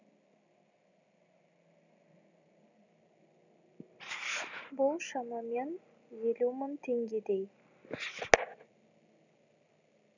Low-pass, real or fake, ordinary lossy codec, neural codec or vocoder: 7.2 kHz; real; none; none